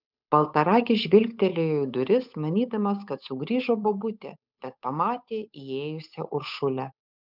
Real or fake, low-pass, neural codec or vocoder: fake; 5.4 kHz; codec, 16 kHz, 8 kbps, FunCodec, trained on Chinese and English, 25 frames a second